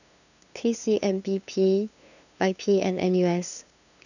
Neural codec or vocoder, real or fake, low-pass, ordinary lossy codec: codec, 16 kHz, 2 kbps, FunCodec, trained on LibriTTS, 25 frames a second; fake; 7.2 kHz; none